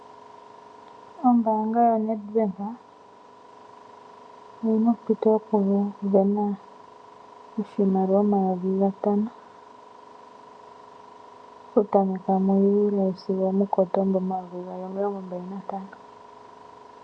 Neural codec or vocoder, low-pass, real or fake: none; 9.9 kHz; real